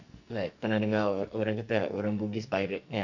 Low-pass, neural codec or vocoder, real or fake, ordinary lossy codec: 7.2 kHz; codec, 44.1 kHz, 2.6 kbps, SNAC; fake; none